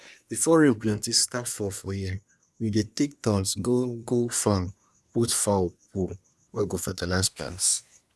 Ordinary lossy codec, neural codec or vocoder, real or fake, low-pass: none; codec, 24 kHz, 1 kbps, SNAC; fake; none